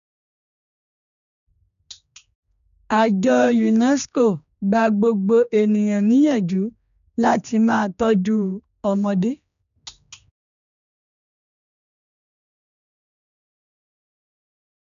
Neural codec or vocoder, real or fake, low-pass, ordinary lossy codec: codec, 16 kHz, 2 kbps, X-Codec, HuBERT features, trained on general audio; fake; 7.2 kHz; none